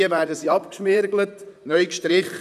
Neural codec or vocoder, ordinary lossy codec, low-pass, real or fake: vocoder, 44.1 kHz, 128 mel bands, Pupu-Vocoder; none; 14.4 kHz; fake